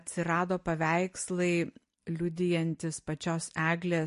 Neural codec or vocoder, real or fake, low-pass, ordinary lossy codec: none; real; 10.8 kHz; MP3, 48 kbps